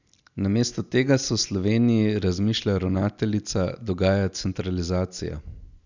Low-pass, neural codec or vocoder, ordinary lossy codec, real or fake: 7.2 kHz; none; none; real